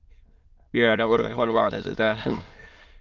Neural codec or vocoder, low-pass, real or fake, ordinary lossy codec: autoencoder, 22.05 kHz, a latent of 192 numbers a frame, VITS, trained on many speakers; 7.2 kHz; fake; Opus, 32 kbps